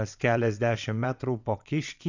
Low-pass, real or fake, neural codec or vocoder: 7.2 kHz; fake; vocoder, 22.05 kHz, 80 mel bands, WaveNeXt